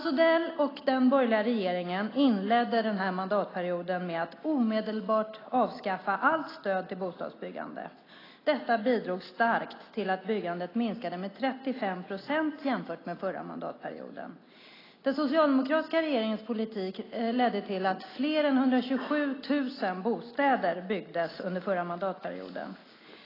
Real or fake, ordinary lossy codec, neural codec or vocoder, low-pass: real; AAC, 24 kbps; none; 5.4 kHz